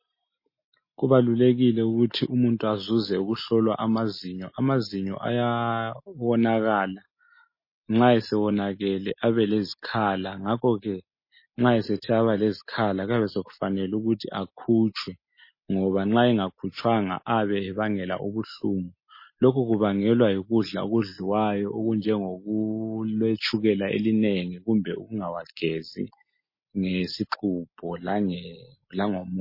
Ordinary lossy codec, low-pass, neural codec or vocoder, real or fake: MP3, 24 kbps; 5.4 kHz; none; real